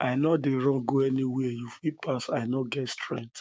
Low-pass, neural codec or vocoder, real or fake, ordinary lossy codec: none; codec, 16 kHz, 6 kbps, DAC; fake; none